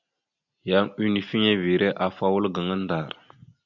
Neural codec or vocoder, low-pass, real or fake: none; 7.2 kHz; real